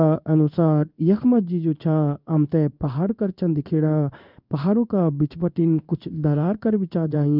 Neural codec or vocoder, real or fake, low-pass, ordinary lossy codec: codec, 16 kHz in and 24 kHz out, 1 kbps, XY-Tokenizer; fake; 5.4 kHz; none